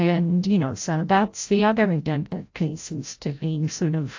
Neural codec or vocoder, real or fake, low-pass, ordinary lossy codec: codec, 16 kHz, 0.5 kbps, FreqCodec, larger model; fake; 7.2 kHz; AAC, 48 kbps